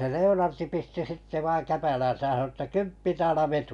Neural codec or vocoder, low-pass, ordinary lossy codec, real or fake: none; 10.8 kHz; none; real